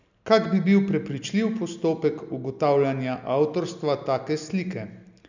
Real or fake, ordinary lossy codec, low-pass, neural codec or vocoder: real; none; 7.2 kHz; none